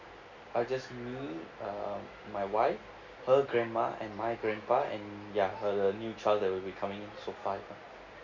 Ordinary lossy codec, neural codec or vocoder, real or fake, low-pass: none; none; real; 7.2 kHz